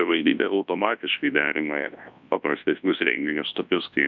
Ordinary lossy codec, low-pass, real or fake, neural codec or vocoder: MP3, 64 kbps; 7.2 kHz; fake; codec, 24 kHz, 0.9 kbps, WavTokenizer, large speech release